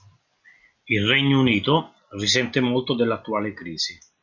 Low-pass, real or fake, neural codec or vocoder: 7.2 kHz; real; none